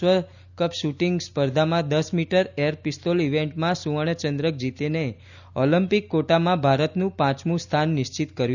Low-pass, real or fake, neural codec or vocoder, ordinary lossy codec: 7.2 kHz; real; none; none